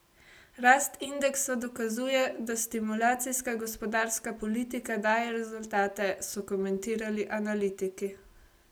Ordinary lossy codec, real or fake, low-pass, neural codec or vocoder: none; real; none; none